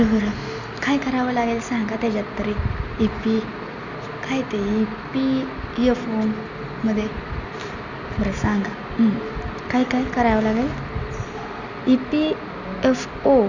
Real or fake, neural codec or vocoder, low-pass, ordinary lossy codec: real; none; 7.2 kHz; none